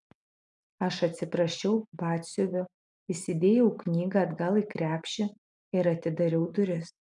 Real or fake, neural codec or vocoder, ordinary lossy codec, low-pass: real; none; MP3, 96 kbps; 10.8 kHz